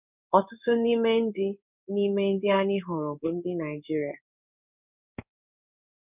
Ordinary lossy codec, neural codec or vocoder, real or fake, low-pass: none; codec, 16 kHz in and 24 kHz out, 1 kbps, XY-Tokenizer; fake; 3.6 kHz